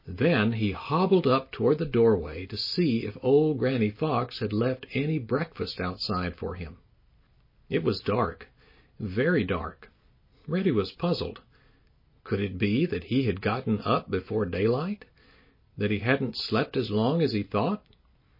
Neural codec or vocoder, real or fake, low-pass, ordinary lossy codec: none; real; 5.4 kHz; MP3, 24 kbps